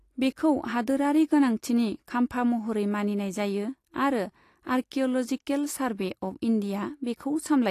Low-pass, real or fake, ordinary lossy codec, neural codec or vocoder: 14.4 kHz; real; AAC, 48 kbps; none